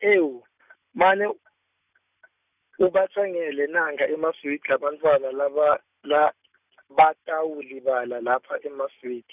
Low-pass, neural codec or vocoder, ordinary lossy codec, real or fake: 3.6 kHz; none; none; real